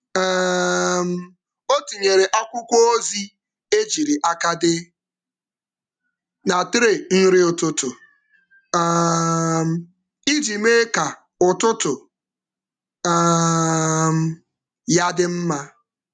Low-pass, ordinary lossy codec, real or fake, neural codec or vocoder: 9.9 kHz; none; real; none